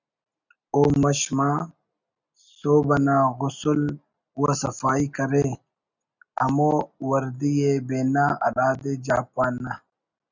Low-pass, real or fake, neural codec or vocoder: 7.2 kHz; real; none